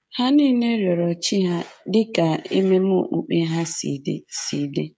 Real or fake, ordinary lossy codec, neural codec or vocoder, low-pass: fake; none; codec, 16 kHz, 16 kbps, FreqCodec, smaller model; none